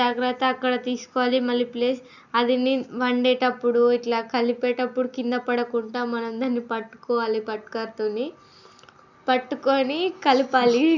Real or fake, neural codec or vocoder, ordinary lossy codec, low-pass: real; none; none; 7.2 kHz